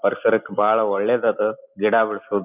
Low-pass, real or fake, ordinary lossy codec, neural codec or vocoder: 3.6 kHz; real; none; none